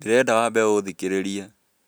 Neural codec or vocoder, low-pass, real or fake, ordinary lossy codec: none; none; real; none